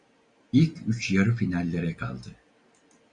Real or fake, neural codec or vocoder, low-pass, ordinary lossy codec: real; none; 9.9 kHz; Opus, 64 kbps